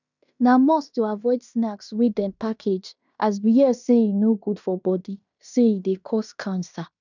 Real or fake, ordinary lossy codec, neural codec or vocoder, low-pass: fake; none; codec, 16 kHz in and 24 kHz out, 0.9 kbps, LongCat-Audio-Codec, fine tuned four codebook decoder; 7.2 kHz